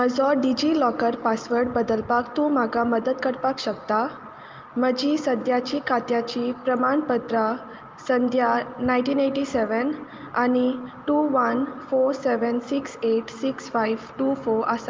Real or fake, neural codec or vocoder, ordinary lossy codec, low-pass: real; none; Opus, 24 kbps; 7.2 kHz